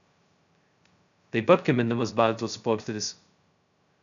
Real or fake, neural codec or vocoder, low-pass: fake; codec, 16 kHz, 0.2 kbps, FocalCodec; 7.2 kHz